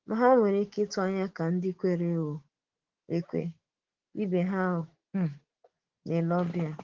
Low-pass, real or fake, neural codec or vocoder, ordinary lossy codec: 7.2 kHz; fake; autoencoder, 48 kHz, 128 numbers a frame, DAC-VAE, trained on Japanese speech; Opus, 16 kbps